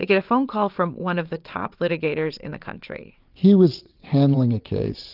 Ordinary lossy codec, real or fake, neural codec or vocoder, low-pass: Opus, 32 kbps; fake; vocoder, 22.05 kHz, 80 mel bands, WaveNeXt; 5.4 kHz